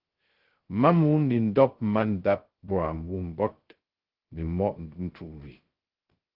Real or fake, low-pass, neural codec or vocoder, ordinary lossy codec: fake; 5.4 kHz; codec, 16 kHz, 0.2 kbps, FocalCodec; Opus, 16 kbps